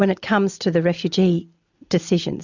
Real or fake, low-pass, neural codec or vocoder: real; 7.2 kHz; none